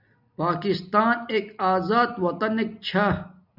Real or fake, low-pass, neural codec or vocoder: real; 5.4 kHz; none